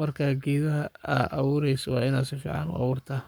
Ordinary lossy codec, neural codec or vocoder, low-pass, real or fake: none; codec, 44.1 kHz, 7.8 kbps, Pupu-Codec; none; fake